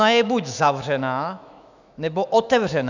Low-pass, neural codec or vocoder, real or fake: 7.2 kHz; autoencoder, 48 kHz, 128 numbers a frame, DAC-VAE, trained on Japanese speech; fake